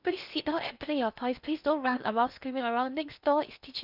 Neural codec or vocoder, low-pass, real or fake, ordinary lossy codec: codec, 16 kHz in and 24 kHz out, 0.6 kbps, FocalCodec, streaming, 4096 codes; 5.4 kHz; fake; none